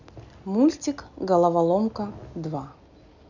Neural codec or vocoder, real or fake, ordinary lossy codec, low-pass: none; real; none; 7.2 kHz